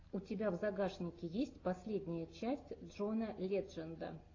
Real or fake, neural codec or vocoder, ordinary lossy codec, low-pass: real; none; AAC, 32 kbps; 7.2 kHz